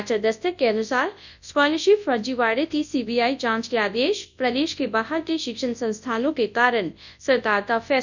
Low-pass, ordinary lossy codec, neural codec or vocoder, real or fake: 7.2 kHz; none; codec, 24 kHz, 0.9 kbps, WavTokenizer, large speech release; fake